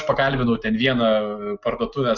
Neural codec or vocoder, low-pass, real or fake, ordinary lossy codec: none; 7.2 kHz; real; Opus, 64 kbps